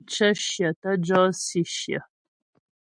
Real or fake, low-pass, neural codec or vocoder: real; 9.9 kHz; none